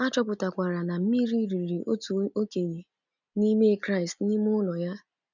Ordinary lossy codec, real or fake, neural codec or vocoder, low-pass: none; real; none; 7.2 kHz